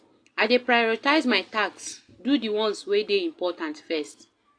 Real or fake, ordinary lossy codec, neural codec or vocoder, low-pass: real; AAC, 48 kbps; none; 9.9 kHz